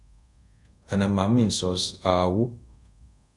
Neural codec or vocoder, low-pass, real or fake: codec, 24 kHz, 0.5 kbps, DualCodec; 10.8 kHz; fake